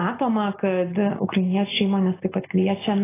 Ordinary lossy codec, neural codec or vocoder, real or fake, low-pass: AAC, 16 kbps; none; real; 3.6 kHz